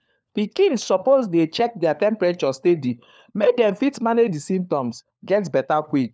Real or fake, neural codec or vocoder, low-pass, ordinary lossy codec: fake; codec, 16 kHz, 4 kbps, FunCodec, trained on LibriTTS, 50 frames a second; none; none